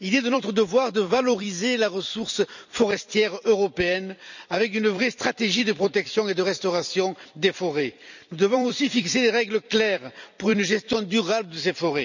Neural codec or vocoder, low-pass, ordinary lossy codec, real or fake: vocoder, 44.1 kHz, 128 mel bands every 256 samples, BigVGAN v2; 7.2 kHz; none; fake